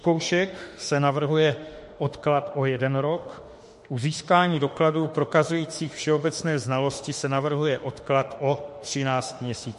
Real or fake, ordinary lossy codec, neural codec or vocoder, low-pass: fake; MP3, 48 kbps; autoencoder, 48 kHz, 32 numbers a frame, DAC-VAE, trained on Japanese speech; 14.4 kHz